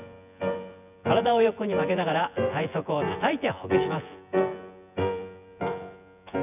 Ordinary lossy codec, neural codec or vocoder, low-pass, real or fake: none; vocoder, 24 kHz, 100 mel bands, Vocos; 3.6 kHz; fake